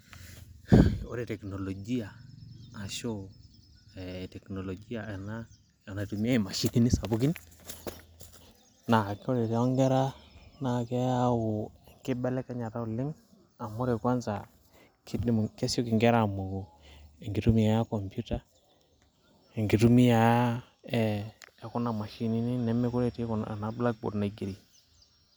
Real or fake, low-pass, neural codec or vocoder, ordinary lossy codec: real; none; none; none